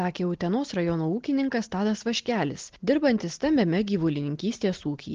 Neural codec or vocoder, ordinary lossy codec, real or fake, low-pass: none; Opus, 16 kbps; real; 7.2 kHz